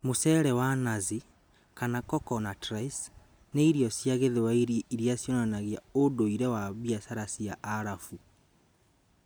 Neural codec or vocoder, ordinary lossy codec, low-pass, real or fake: none; none; none; real